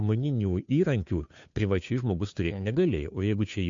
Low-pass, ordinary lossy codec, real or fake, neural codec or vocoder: 7.2 kHz; MP3, 64 kbps; fake; codec, 16 kHz, 2 kbps, FunCodec, trained on Chinese and English, 25 frames a second